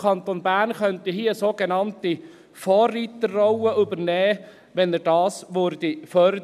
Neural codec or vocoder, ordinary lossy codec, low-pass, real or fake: none; none; 14.4 kHz; real